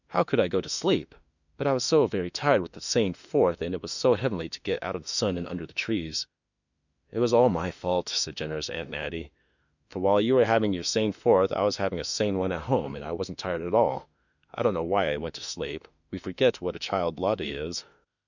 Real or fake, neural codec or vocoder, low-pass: fake; autoencoder, 48 kHz, 32 numbers a frame, DAC-VAE, trained on Japanese speech; 7.2 kHz